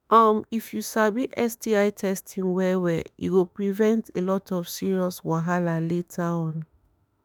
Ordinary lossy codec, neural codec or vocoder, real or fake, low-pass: none; autoencoder, 48 kHz, 32 numbers a frame, DAC-VAE, trained on Japanese speech; fake; none